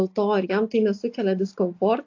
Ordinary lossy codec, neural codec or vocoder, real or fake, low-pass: AAC, 48 kbps; none; real; 7.2 kHz